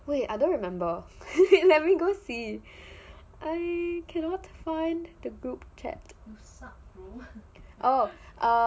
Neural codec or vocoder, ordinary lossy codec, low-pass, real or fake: none; none; none; real